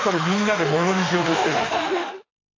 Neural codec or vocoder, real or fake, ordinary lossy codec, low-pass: autoencoder, 48 kHz, 32 numbers a frame, DAC-VAE, trained on Japanese speech; fake; AAC, 32 kbps; 7.2 kHz